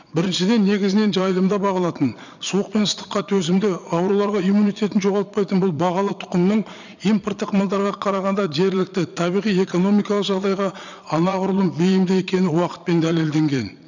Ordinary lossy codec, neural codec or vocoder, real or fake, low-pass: none; vocoder, 22.05 kHz, 80 mel bands, Vocos; fake; 7.2 kHz